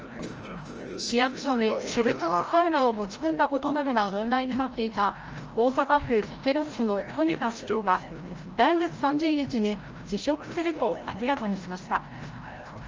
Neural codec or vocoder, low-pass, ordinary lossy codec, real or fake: codec, 16 kHz, 0.5 kbps, FreqCodec, larger model; 7.2 kHz; Opus, 24 kbps; fake